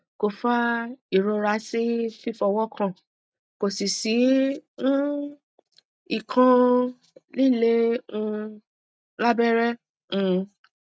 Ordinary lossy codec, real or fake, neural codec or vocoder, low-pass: none; real; none; none